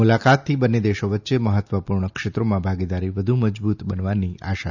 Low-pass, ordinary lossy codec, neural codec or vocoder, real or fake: 7.2 kHz; none; none; real